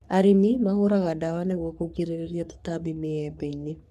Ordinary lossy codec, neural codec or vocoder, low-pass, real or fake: none; codec, 44.1 kHz, 3.4 kbps, Pupu-Codec; 14.4 kHz; fake